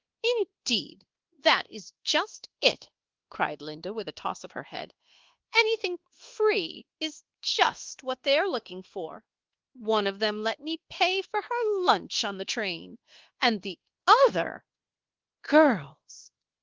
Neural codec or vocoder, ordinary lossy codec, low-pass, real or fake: codec, 24 kHz, 1.2 kbps, DualCodec; Opus, 16 kbps; 7.2 kHz; fake